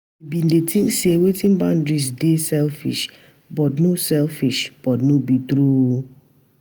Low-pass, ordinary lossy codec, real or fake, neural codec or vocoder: none; none; real; none